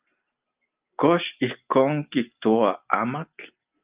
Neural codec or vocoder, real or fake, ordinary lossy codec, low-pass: none; real; Opus, 24 kbps; 3.6 kHz